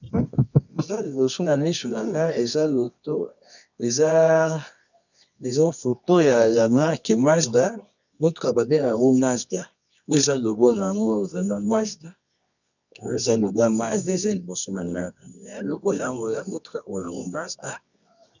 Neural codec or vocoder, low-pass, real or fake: codec, 24 kHz, 0.9 kbps, WavTokenizer, medium music audio release; 7.2 kHz; fake